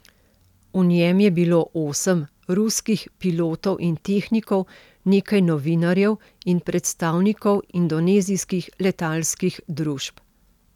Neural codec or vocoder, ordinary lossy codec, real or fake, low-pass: none; none; real; 19.8 kHz